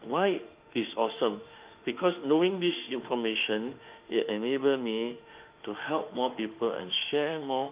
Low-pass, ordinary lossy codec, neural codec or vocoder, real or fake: 3.6 kHz; Opus, 32 kbps; autoencoder, 48 kHz, 32 numbers a frame, DAC-VAE, trained on Japanese speech; fake